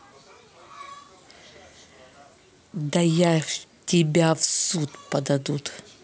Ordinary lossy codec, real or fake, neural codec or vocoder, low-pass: none; real; none; none